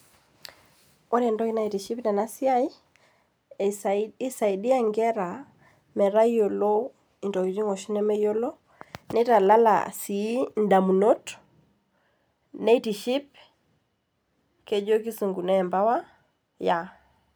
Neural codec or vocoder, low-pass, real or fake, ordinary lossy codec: none; none; real; none